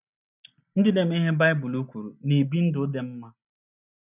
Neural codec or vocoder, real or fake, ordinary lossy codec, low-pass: none; real; none; 3.6 kHz